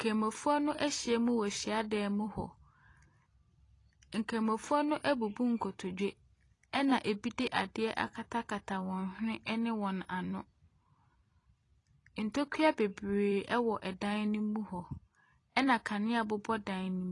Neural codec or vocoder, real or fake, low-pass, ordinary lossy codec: vocoder, 44.1 kHz, 128 mel bands every 256 samples, BigVGAN v2; fake; 10.8 kHz; AAC, 32 kbps